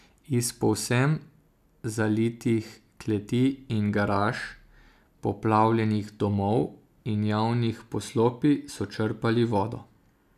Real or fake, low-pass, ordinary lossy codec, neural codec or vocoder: real; 14.4 kHz; none; none